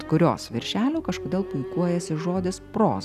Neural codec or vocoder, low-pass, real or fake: none; 14.4 kHz; real